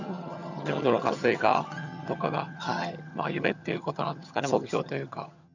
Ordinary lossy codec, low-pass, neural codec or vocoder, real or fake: none; 7.2 kHz; vocoder, 22.05 kHz, 80 mel bands, HiFi-GAN; fake